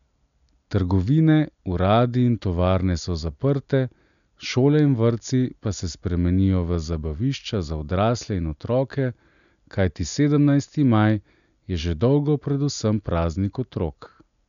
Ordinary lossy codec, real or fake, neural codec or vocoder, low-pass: none; real; none; 7.2 kHz